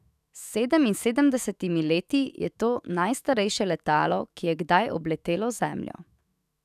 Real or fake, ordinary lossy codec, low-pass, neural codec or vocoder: fake; none; 14.4 kHz; autoencoder, 48 kHz, 128 numbers a frame, DAC-VAE, trained on Japanese speech